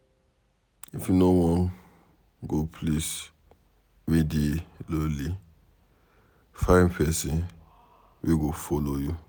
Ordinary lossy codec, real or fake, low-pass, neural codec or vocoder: none; real; none; none